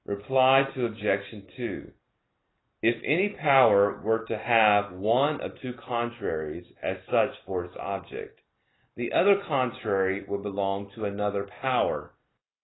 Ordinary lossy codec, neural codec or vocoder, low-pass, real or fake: AAC, 16 kbps; none; 7.2 kHz; real